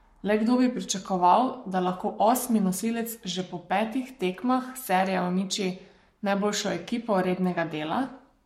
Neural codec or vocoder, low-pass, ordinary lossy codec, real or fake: codec, 44.1 kHz, 7.8 kbps, Pupu-Codec; 19.8 kHz; MP3, 64 kbps; fake